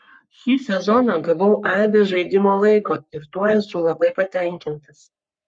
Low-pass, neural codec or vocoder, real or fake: 9.9 kHz; codec, 44.1 kHz, 3.4 kbps, Pupu-Codec; fake